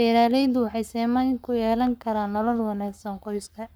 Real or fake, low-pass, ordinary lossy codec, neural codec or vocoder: fake; none; none; codec, 44.1 kHz, 7.8 kbps, Pupu-Codec